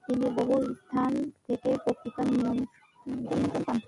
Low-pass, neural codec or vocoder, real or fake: 10.8 kHz; none; real